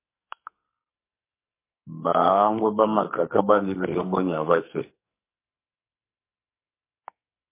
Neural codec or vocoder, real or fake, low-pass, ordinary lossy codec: codec, 44.1 kHz, 2.6 kbps, SNAC; fake; 3.6 kHz; MP3, 24 kbps